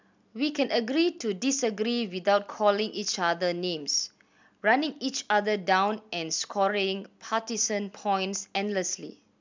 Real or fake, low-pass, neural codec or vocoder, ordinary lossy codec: real; 7.2 kHz; none; MP3, 64 kbps